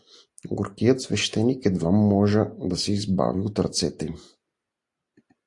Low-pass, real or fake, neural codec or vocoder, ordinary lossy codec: 10.8 kHz; real; none; AAC, 64 kbps